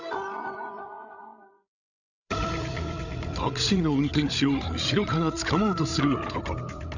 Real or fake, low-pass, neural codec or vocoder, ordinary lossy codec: fake; 7.2 kHz; codec, 16 kHz, 16 kbps, FreqCodec, larger model; none